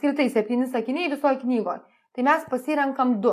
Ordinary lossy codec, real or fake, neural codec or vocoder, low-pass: AAC, 64 kbps; real; none; 14.4 kHz